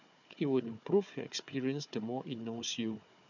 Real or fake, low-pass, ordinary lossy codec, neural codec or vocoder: fake; 7.2 kHz; none; codec, 16 kHz, 4 kbps, FunCodec, trained on Chinese and English, 50 frames a second